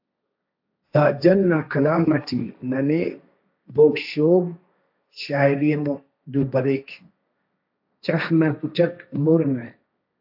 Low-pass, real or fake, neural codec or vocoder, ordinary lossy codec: 5.4 kHz; fake; codec, 16 kHz, 1.1 kbps, Voila-Tokenizer; AAC, 48 kbps